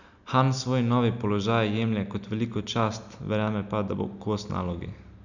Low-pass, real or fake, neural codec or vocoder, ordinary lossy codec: 7.2 kHz; real; none; none